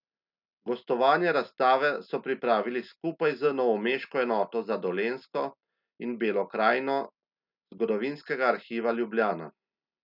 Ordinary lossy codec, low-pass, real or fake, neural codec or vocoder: none; 5.4 kHz; real; none